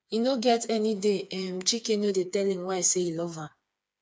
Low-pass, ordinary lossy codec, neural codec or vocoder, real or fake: none; none; codec, 16 kHz, 4 kbps, FreqCodec, smaller model; fake